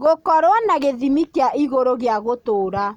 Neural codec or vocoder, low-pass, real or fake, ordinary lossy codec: none; 19.8 kHz; real; none